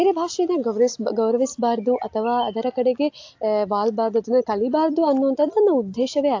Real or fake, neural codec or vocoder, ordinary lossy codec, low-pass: real; none; AAC, 48 kbps; 7.2 kHz